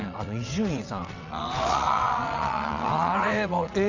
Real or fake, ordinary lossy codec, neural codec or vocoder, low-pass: fake; none; vocoder, 22.05 kHz, 80 mel bands, WaveNeXt; 7.2 kHz